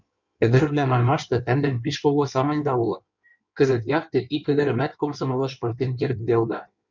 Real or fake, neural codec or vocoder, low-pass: fake; codec, 16 kHz in and 24 kHz out, 1.1 kbps, FireRedTTS-2 codec; 7.2 kHz